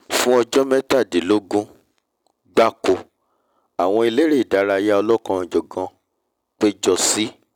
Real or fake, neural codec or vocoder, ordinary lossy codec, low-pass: real; none; none; 19.8 kHz